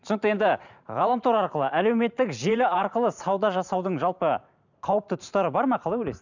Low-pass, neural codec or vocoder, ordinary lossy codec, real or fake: 7.2 kHz; vocoder, 44.1 kHz, 128 mel bands every 512 samples, BigVGAN v2; none; fake